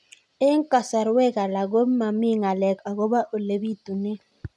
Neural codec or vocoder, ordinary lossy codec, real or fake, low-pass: none; none; real; none